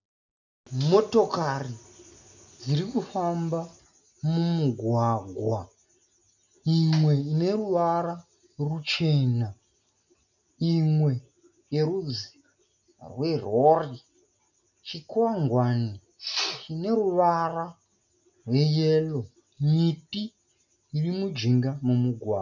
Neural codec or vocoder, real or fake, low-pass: none; real; 7.2 kHz